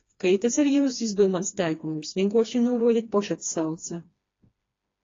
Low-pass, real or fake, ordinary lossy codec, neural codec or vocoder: 7.2 kHz; fake; AAC, 32 kbps; codec, 16 kHz, 2 kbps, FreqCodec, smaller model